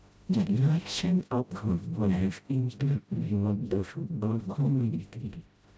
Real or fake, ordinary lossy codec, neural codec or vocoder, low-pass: fake; none; codec, 16 kHz, 0.5 kbps, FreqCodec, smaller model; none